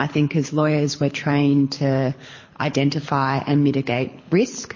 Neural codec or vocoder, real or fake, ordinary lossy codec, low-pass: codec, 24 kHz, 6 kbps, HILCodec; fake; MP3, 32 kbps; 7.2 kHz